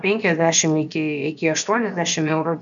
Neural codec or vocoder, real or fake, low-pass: codec, 16 kHz, about 1 kbps, DyCAST, with the encoder's durations; fake; 7.2 kHz